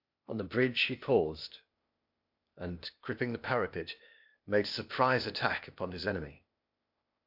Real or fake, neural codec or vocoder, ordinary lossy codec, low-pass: fake; codec, 16 kHz, 0.8 kbps, ZipCodec; MP3, 48 kbps; 5.4 kHz